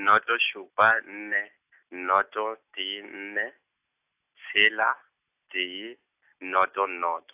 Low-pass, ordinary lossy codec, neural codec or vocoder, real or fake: 3.6 kHz; AAC, 32 kbps; none; real